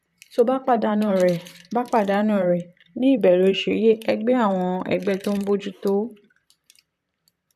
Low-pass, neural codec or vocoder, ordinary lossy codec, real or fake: 14.4 kHz; vocoder, 44.1 kHz, 128 mel bands, Pupu-Vocoder; none; fake